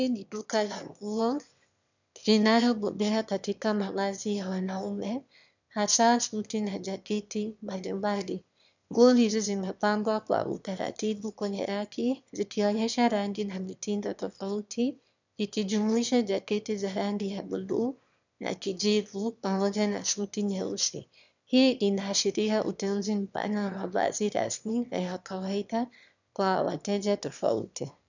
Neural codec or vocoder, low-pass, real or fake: autoencoder, 22.05 kHz, a latent of 192 numbers a frame, VITS, trained on one speaker; 7.2 kHz; fake